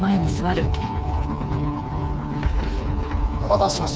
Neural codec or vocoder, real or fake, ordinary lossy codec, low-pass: codec, 16 kHz, 4 kbps, FreqCodec, smaller model; fake; none; none